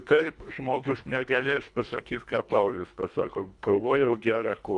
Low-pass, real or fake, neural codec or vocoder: 10.8 kHz; fake; codec, 24 kHz, 1.5 kbps, HILCodec